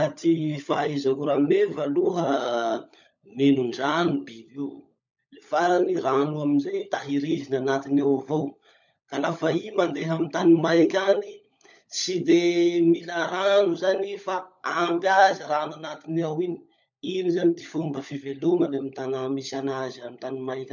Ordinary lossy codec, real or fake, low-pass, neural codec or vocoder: none; fake; 7.2 kHz; codec, 16 kHz, 16 kbps, FunCodec, trained on LibriTTS, 50 frames a second